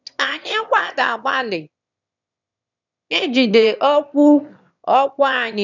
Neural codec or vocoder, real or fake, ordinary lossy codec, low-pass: autoencoder, 22.05 kHz, a latent of 192 numbers a frame, VITS, trained on one speaker; fake; none; 7.2 kHz